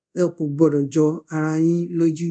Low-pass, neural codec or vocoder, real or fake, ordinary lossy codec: 9.9 kHz; codec, 24 kHz, 0.5 kbps, DualCodec; fake; none